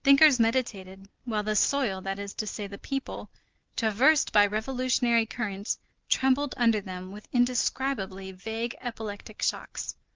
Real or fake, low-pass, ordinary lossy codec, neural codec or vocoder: real; 7.2 kHz; Opus, 32 kbps; none